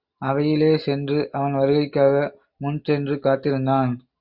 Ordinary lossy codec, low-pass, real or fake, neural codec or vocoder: Opus, 64 kbps; 5.4 kHz; real; none